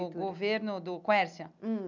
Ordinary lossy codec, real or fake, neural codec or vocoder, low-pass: none; real; none; 7.2 kHz